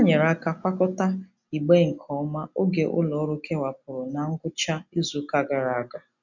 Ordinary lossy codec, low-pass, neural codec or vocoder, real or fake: none; 7.2 kHz; none; real